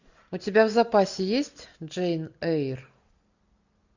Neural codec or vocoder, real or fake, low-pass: none; real; 7.2 kHz